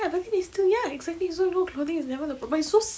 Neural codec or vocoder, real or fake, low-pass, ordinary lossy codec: none; real; none; none